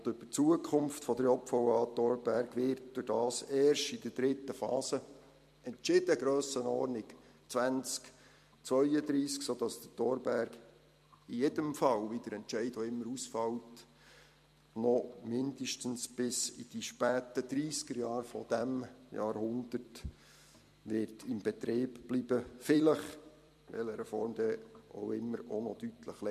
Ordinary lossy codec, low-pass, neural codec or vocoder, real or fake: MP3, 64 kbps; 14.4 kHz; vocoder, 44.1 kHz, 128 mel bands every 256 samples, BigVGAN v2; fake